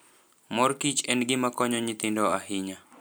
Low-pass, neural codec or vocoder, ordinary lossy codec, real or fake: none; none; none; real